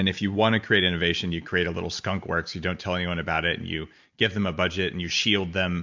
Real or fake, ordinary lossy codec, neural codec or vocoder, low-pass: real; MP3, 64 kbps; none; 7.2 kHz